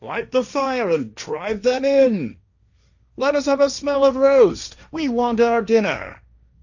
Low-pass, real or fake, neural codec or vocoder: 7.2 kHz; fake; codec, 16 kHz, 1.1 kbps, Voila-Tokenizer